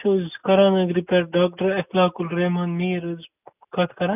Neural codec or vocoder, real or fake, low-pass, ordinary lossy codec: none; real; 3.6 kHz; none